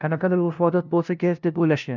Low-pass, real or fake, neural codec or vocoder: 7.2 kHz; fake; codec, 16 kHz, 0.5 kbps, FunCodec, trained on LibriTTS, 25 frames a second